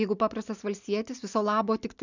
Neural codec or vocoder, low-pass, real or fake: none; 7.2 kHz; real